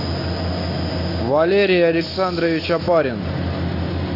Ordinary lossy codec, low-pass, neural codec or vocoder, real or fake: AAC, 32 kbps; 5.4 kHz; none; real